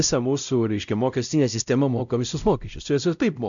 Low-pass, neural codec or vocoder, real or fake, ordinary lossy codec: 7.2 kHz; codec, 16 kHz, 0.5 kbps, X-Codec, WavLM features, trained on Multilingual LibriSpeech; fake; MP3, 96 kbps